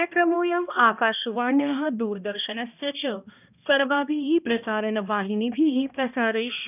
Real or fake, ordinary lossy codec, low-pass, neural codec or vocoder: fake; none; 3.6 kHz; codec, 16 kHz, 1 kbps, X-Codec, HuBERT features, trained on balanced general audio